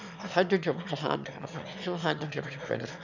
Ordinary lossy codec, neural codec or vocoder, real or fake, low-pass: none; autoencoder, 22.05 kHz, a latent of 192 numbers a frame, VITS, trained on one speaker; fake; 7.2 kHz